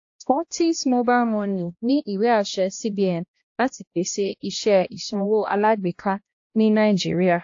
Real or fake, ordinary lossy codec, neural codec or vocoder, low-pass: fake; AAC, 48 kbps; codec, 16 kHz, 1 kbps, X-Codec, HuBERT features, trained on balanced general audio; 7.2 kHz